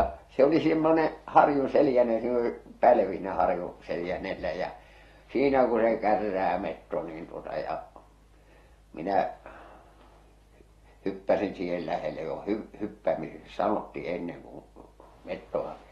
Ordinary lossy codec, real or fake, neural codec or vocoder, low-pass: AAC, 32 kbps; real; none; 19.8 kHz